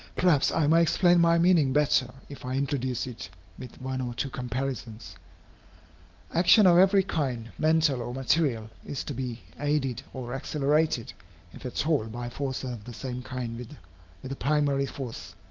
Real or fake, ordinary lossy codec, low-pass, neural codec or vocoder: real; Opus, 24 kbps; 7.2 kHz; none